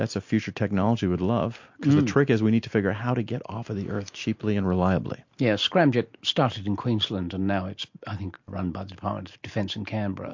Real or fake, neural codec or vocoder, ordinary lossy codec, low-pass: real; none; MP3, 48 kbps; 7.2 kHz